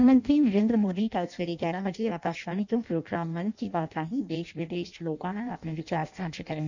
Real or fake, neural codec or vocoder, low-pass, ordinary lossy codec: fake; codec, 16 kHz in and 24 kHz out, 0.6 kbps, FireRedTTS-2 codec; 7.2 kHz; none